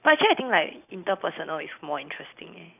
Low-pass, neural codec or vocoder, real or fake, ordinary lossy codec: 3.6 kHz; none; real; none